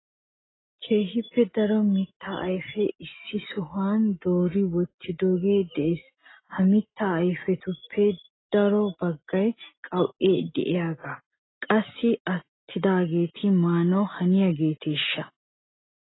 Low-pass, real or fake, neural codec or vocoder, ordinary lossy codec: 7.2 kHz; real; none; AAC, 16 kbps